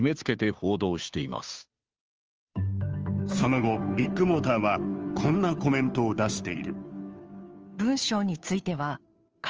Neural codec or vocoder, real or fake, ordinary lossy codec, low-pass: codec, 16 kHz, 2 kbps, FunCodec, trained on Chinese and English, 25 frames a second; fake; Opus, 24 kbps; 7.2 kHz